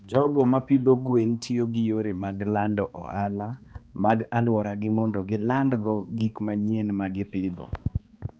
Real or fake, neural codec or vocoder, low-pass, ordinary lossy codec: fake; codec, 16 kHz, 2 kbps, X-Codec, HuBERT features, trained on balanced general audio; none; none